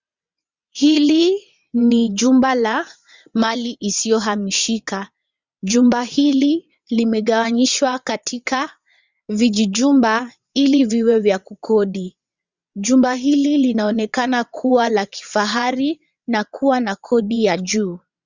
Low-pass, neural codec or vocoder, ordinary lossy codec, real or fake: 7.2 kHz; vocoder, 22.05 kHz, 80 mel bands, WaveNeXt; Opus, 64 kbps; fake